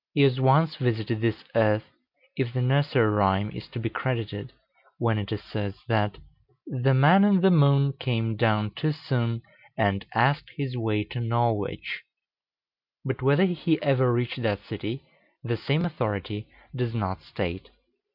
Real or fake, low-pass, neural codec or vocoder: real; 5.4 kHz; none